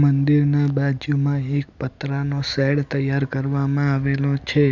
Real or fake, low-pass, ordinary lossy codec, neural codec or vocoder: real; 7.2 kHz; none; none